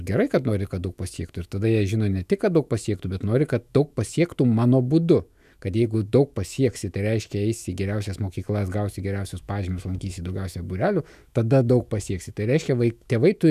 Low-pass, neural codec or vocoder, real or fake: 14.4 kHz; autoencoder, 48 kHz, 128 numbers a frame, DAC-VAE, trained on Japanese speech; fake